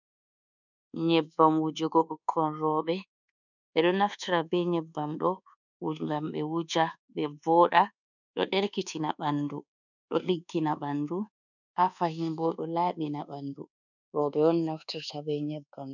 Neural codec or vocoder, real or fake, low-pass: codec, 24 kHz, 1.2 kbps, DualCodec; fake; 7.2 kHz